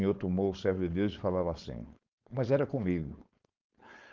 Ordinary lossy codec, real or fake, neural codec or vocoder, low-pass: Opus, 32 kbps; fake; codec, 16 kHz, 4.8 kbps, FACodec; 7.2 kHz